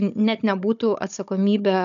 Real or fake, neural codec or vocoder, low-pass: fake; codec, 16 kHz, 16 kbps, FunCodec, trained on Chinese and English, 50 frames a second; 7.2 kHz